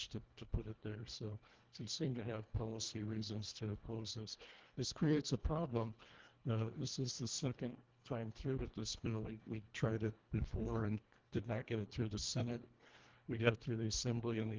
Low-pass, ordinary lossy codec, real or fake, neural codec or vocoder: 7.2 kHz; Opus, 16 kbps; fake; codec, 24 kHz, 1.5 kbps, HILCodec